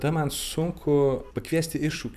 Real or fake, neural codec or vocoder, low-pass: fake; vocoder, 44.1 kHz, 128 mel bands every 256 samples, BigVGAN v2; 14.4 kHz